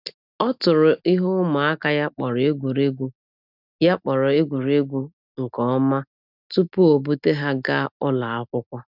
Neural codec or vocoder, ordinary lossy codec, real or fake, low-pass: none; none; real; 5.4 kHz